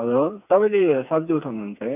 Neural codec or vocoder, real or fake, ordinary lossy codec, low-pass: codec, 16 kHz, 4 kbps, FreqCodec, smaller model; fake; none; 3.6 kHz